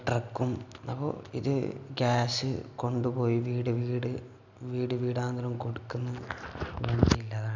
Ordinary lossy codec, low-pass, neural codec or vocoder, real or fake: none; 7.2 kHz; none; real